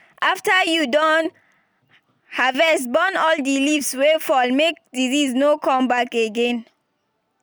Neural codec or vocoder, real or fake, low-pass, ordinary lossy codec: none; real; none; none